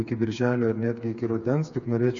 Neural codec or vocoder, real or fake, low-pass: codec, 16 kHz, 4 kbps, FreqCodec, smaller model; fake; 7.2 kHz